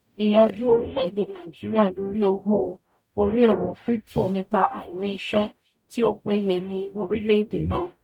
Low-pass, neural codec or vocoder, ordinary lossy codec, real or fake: 19.8 kHz; codec, 44.1 kHz, 0.9 kbps, DAC; none; fake